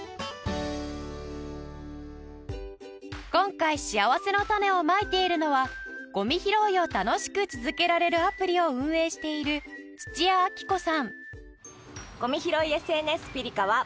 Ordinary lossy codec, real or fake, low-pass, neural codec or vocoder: none; real; none; none